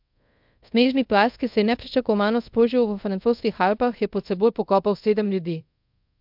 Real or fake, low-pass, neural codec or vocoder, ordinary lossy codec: fake; 5.4 kHz; codec, 24 kHz, 0.5 kbps, DualCodec; MP3, 48 kbps